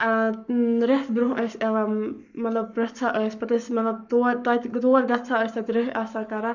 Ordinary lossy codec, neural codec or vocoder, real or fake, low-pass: none; codec, 44.1 kHz, 7.8 kbps, Pupu-Codec; fake; 7.2 kHz